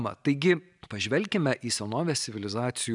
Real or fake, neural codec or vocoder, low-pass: real; none; 10.8 kHz